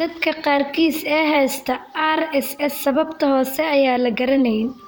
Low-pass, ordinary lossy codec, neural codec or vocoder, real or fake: none; none; vocoder, 44.1 kHz, 128 mel bands, Pupu-Vocoder; fake